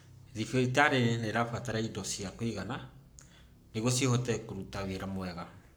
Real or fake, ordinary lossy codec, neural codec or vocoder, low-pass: fake; none; codec, 44.1 kHz, 7.8 kbps, Pupu-Codec; none